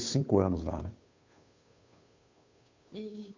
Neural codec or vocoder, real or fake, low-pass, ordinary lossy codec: codec, 16 kHz, 2 kbps, FunCodec, trained on Chinese and English, 25 frames a second; fake; 7.2 kHz; MP3, 64 kbps